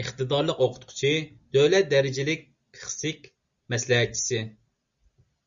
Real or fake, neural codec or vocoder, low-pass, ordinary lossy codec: real; none; 7.2 kHz; Opus, 64 kbps